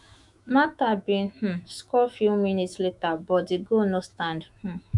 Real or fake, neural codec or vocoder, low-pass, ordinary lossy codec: fake; autoencoder, 48 kHz, 128 numbers a frame, DAC-VAE, trained on Japanese speech; 10.8 kHz; none